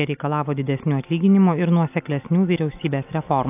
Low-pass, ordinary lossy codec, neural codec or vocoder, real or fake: 3.6 kHz; AAC, 32 kbps; none; real